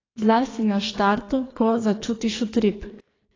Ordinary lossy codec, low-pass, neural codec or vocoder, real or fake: AAC, 32 kbps; 7.2 kHz; codec, 44.1 kHz, 2.6 kbps, SNAC; fake